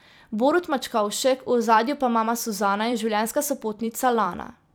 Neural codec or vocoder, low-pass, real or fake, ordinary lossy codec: vocoder, 44.1 kHz, 128 mel bands every 256 samples, BigVGAN v2; none; fake; none